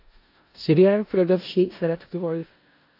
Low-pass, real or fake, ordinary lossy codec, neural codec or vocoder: 5.4 kHz; fake; AAC, 32 kbps; codec, 16 kHz in and 24 kHz out, 0.4 kbps, LongCat-Audio-Codec, four codebook decoder